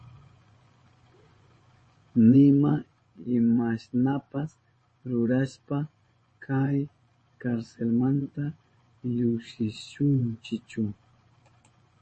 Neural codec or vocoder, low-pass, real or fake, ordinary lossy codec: vocoder, 24 kHz, 100 mel bands, Vocos; 10.8 kHz; fake; MP3, 32 kbps